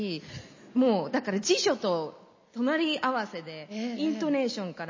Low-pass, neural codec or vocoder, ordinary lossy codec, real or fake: 7.2 kHz; none; MP3, 32 kbps; real